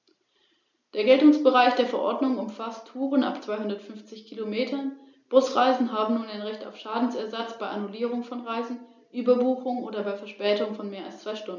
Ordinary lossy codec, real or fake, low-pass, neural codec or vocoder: none; real; 7.2 kHz; none